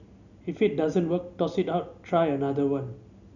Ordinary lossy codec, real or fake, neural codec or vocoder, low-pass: none; real; none; 7.2 kHz